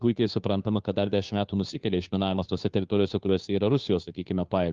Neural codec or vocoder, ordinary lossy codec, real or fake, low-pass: codec, 16 kHz, 2 kbps, FunCodec, trained on Chinese and English, 25 frames a second; Opus, 32 kbps; fake; 7.2 kHz